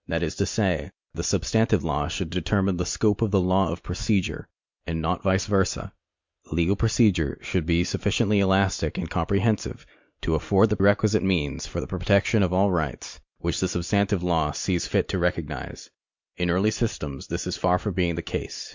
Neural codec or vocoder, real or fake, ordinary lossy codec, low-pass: none; real; MP3, 64 kbps; 7.2 kHz